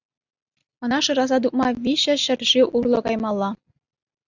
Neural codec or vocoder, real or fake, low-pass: none; real; 7.2 kHz